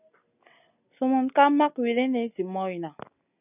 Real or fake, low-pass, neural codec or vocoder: real; 3.6 kHz; none